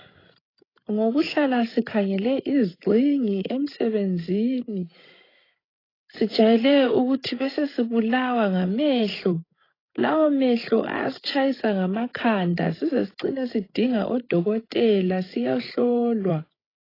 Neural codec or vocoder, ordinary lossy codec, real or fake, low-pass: codec, 16 kHz, 16 kbps, FreqCodec, larger model; AAC, 24 kbps; fake; 5.4 kHz